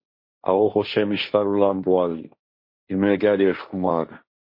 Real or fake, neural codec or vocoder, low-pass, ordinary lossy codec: fake; codec, 16 kHz, 1.1 kbps, Voila-Tokenizer; 5.4 kHz; MP3, 32 kbps